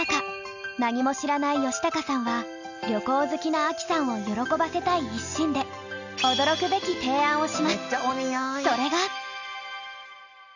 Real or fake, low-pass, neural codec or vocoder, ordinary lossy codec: real; 7.2 kHz; none; none